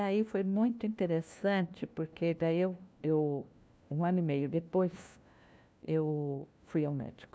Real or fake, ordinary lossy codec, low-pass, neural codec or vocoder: fake; none; none; codec, 16 kHz, 1 kbps, FunCodec, trained on LibriTTS, 50 frames a second